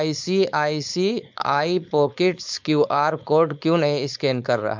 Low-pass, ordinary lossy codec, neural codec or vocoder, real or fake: 7.2 kHz; MP3, 64 kbps; codec, 16 kHz, 4.8 kbps, FACodec; fake